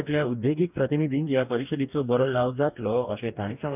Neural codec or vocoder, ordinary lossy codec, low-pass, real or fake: codec, 44.1 kHz, 2.6 kbps, DAC; none; 3.6 kHz; fake